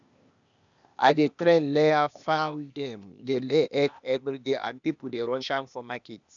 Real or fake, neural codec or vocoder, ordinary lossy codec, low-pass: fake; codec, 16 kHz, 0.8 kbps, ZipCodec; none; 7.2 kHz